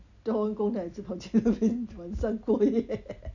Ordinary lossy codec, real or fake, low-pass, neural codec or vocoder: none; real; 7.2 kHz; none